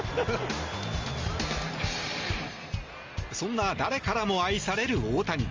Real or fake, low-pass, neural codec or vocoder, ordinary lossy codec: real; 7.2 kHz; none; Opus, 32 kbps